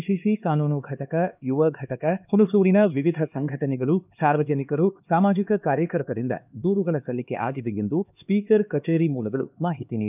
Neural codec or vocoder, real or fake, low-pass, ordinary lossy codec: codec, 16 kHz, 2 kbps, X-Codec, HuBERT features, trained on LibriSpeech; fake; 3.6 kHz; none